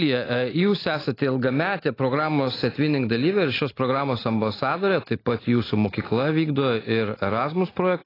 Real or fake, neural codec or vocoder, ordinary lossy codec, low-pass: real; none; AAC, 24 kbps; 5.4 kHz